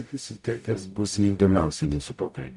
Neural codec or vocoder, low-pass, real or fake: codec, 44.1 kHz, 0.9 kbps, DAC; 10.8 kHz; fake